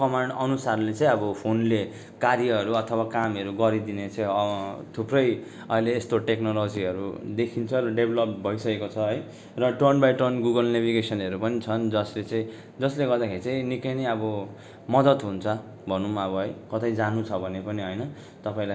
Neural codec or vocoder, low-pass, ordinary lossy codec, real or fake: none; none; none; real